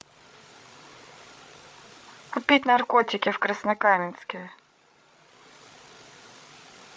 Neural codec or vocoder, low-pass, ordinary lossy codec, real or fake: codec, 16 kHz, 8 kbps, FreqCodec, larger model; none; none; fake